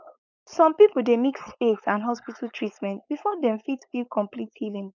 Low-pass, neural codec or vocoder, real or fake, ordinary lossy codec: 7.2 kHz; codec, 16 kHz, 4.8 kbps, FACodec; fake; none